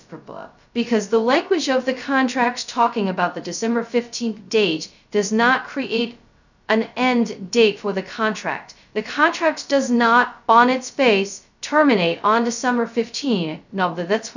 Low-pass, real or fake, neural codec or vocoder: 7.2 kHz; fake; codec, 16 kHz, 0.2 kbps, FocalCodec